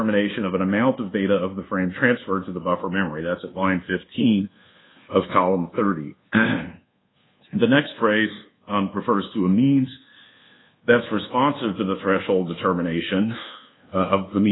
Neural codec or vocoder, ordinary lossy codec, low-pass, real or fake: codec, 24 kHz, 1.2 kbps, DualCodec; AAC, 16 kbps; 7.2 kHz; fake